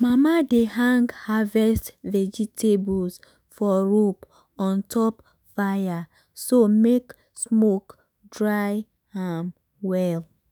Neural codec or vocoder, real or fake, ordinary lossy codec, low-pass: autoencoder, 48 kHz, 128 numbers a frame, DAC-VAE, trained on Japanese speech; fake; none; none